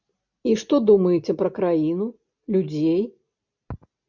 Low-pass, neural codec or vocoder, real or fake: 7.2 kHz; none; real